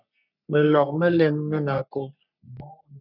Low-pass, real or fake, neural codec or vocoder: 5.4 kHz; fake; codec, 44.1 kHz, 3.4 kbps, Pupu-Codec